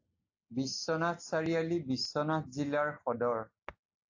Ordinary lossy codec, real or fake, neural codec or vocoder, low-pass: AAC, 48 kbps; real; none; 7.2 kHz